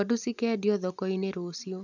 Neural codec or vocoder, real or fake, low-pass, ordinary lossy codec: none; real; 7.2 kHz; none